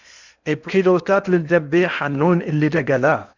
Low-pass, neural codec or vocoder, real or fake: 7.2 kHz; codec, 16 kHz in and 24 kHz out, 0.8 kbps, FocalCodec, streaming, 65536 codes; fake